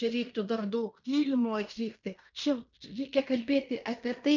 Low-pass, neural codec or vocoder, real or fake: 7.2 kHz; codec, 16 kHz in and 24 kHz out, 0.9 kbps, LongCat-Audio-Codec, fine tuned four codebook decoder; fake